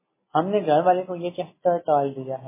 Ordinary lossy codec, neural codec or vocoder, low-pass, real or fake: MP3, 16 kbps; none; 3.6 kHz; real